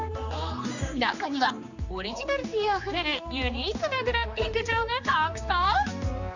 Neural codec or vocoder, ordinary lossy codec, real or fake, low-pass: codec, 16 kHz, 2 kbps, X-Codec, HuBERT features, trained on general audio; none; fake; 7.2 kHz